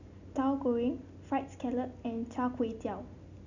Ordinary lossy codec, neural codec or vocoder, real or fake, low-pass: none; none; real; 7.2 kHz